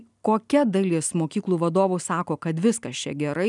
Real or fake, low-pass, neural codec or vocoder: real; 10.8 kHz; none